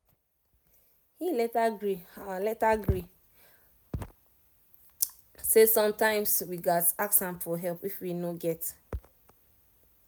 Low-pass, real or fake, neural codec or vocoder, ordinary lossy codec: none; real; none; none